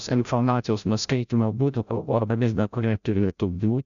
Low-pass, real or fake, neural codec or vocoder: 7.2 kHz; fake; codec, 16 kHz, 0.5 kbps, FreqCodec, larger model